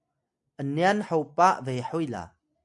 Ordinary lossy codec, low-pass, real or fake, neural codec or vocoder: MP3, 64 kbps; 10.8 kHz; real; none